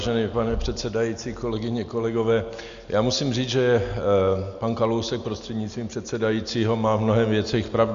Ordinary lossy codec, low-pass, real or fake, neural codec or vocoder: Opus, 64 kbps; 7.2 kHz; real; none